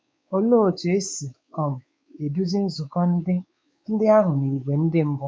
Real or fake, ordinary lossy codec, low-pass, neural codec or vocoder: fake; none; none; codec, 16 kHz, 4 kbps, X-Codec, WavLM features, trained on Multilingual LibriSpeech